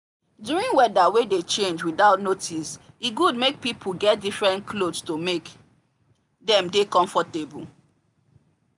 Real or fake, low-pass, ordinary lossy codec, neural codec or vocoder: real; 10.8 kHz; none; none